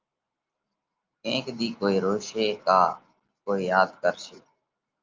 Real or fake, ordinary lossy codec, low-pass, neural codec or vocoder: real; Opus, 24 kbps; 7.2 kHz; none